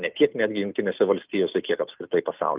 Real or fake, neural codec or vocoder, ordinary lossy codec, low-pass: real; none; Opus, 32 kbps; 3.6 kHz